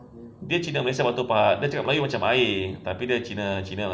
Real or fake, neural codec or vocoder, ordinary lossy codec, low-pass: real; none; none; none